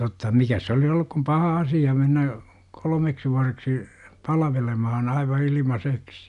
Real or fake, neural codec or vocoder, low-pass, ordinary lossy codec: real; none; 10.8 kHz; none